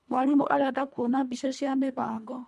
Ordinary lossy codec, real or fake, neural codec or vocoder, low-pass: none; fake; codec, 24 kHz, 1.5 kbps, HILCodec; none